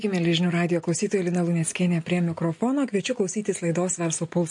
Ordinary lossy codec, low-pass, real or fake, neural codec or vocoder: MP3, 48 kbps; 10.8 kHz; real; none